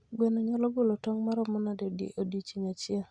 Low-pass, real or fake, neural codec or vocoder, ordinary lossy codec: 9.9 kHz; real; none; Opus, 64 kbps